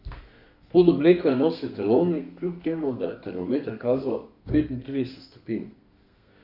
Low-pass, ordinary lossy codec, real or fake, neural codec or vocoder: 5.4 kHz; none; fake; codec, 32 kHz, 1.9 kbps, SNAC